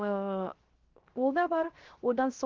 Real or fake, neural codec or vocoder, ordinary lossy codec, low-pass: fake; codec, 16 kHz, 0.3 kbps, FocalCodec; Opus, 16 kbps; 7.2 kHz